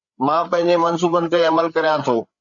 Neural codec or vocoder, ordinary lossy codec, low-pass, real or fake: codec, 16 kHz, 8 kbps, FreqCodec, larger model; Opus, 64 kbps; 7.2 kHz; fake